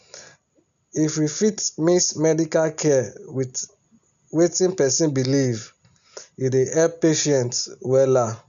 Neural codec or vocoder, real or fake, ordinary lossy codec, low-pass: none; real; none; 7.2 kHz